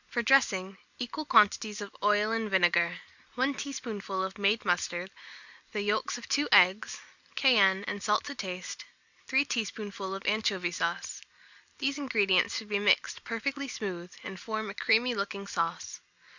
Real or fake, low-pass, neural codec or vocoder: real; 7.2 kHz; none